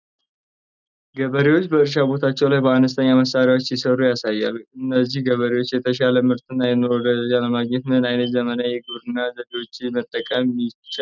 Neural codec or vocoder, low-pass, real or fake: none; 7.2 kHz; real